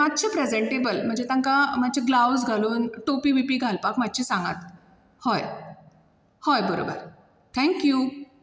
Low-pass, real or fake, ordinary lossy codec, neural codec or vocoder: none; real; none; none